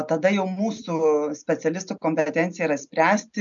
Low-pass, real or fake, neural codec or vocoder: 7.2 kHz; real; none